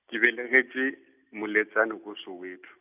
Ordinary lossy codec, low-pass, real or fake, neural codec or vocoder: none; 3.6 kHz; real; none